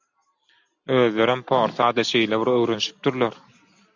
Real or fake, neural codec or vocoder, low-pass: real; none; 7.2 kHz